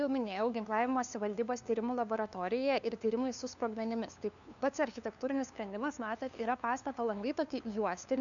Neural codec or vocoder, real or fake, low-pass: codec, 16 kHz, 2 kbps, FunCodec, trained on LibriTTS, 25 frames a second; fake; 7.2 kHz